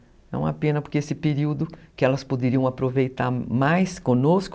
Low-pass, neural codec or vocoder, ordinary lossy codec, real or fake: none; none; none; real